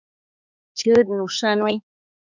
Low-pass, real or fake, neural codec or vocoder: 7.2 kHz; fake; codec, 16 kHz, 2 kbps, X-Codec, HuBERT features, trained on balanced general audio